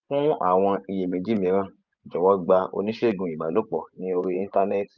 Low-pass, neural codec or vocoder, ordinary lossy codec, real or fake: 7.2 kHz; vocoder, 24 kHz, 100 mel bands, Vocos; none; fake